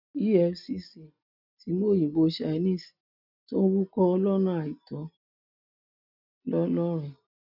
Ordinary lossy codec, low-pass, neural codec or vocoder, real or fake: none; 5.4 kHz; none; real